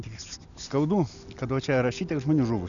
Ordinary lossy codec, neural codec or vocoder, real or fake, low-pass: MP3, 96 kbps; none; real; 7.2 kHz